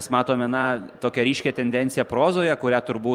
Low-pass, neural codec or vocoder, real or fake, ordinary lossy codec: 19.8 kHz; vocoder, 44.1 kHz, 128 mel bands every 512 samples, BigVGAN v2; fake; Opus, 32 kbps